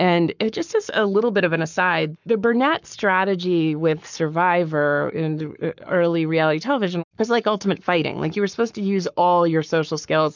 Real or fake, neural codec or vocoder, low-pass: fake; codec, 44.1 kHz, 7.8 kbps, Pupu-Codec; 7.2 kHz